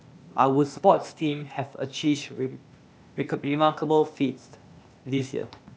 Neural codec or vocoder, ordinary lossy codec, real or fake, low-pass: codec, 16 kHz, 0.8 kbps, ZipCodec; none; fake; none